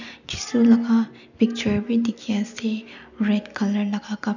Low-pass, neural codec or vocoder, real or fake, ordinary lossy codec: 7.2 kHz; none; real; none